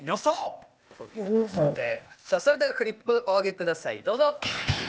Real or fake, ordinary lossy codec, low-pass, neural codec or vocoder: fake; none; none; codec, 16 kHz, 0.8 kbps, ZipCodec